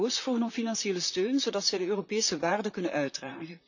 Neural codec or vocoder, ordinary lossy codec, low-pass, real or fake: vocoder, 44.1 kHz, 128 mel bands, Pupu-Vocoder; none; 7.2 kHz; fake